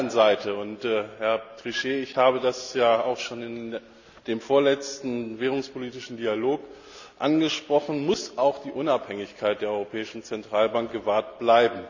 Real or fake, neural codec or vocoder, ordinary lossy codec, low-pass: real; none; none; 7.2 kHz